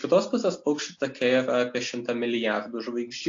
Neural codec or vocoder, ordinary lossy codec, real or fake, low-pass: none; AAC, 48 kbps; real; 7.2 kHz